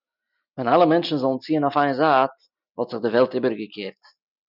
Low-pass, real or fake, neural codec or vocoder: 5.4 kHz; real; none